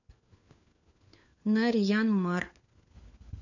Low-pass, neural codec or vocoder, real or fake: 7.2 kHz; codec, 16 kHz, 4 kbps, FunCodec, trained on LibriTTS, 50 frames a second; fake